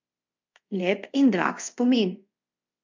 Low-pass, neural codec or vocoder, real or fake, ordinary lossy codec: 7.2 kHz; codec, 24 kHz, 0.5 kbps, DualCodec; fake; MP3, 48 kbps